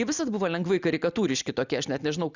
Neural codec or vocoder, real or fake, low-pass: none; real; 7.2 kHz